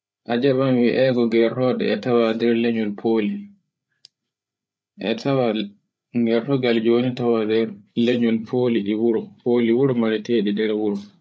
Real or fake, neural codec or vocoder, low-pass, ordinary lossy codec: fake; codec, 16 kHz, 8 kbps, FreqCodec, larger model; none; none